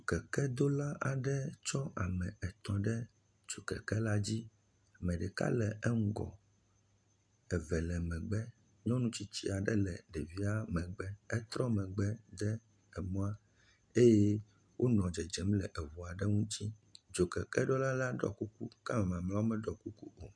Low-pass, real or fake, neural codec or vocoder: 9.9 kHz; real; none